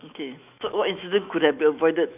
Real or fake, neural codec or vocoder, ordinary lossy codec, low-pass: fake; autoencoder, 48 kHz, 128 numbers a frame, DAC-VAE, trained on Japanese speech; none; 3.6 kHz